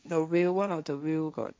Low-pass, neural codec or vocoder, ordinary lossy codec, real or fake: none; codec, 16 kHz, 1.1 kbps, Voila-Tokenizer; none; fake